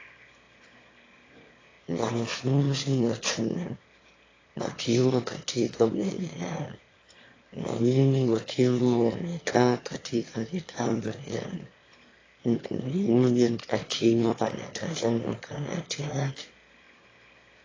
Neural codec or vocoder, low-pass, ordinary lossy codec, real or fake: autoencoder, 22.05 kHz, a latent of 192 numbers a frame, VITS, trained on one speaker; 7.2 kHz; AAC, 32 kbps; fake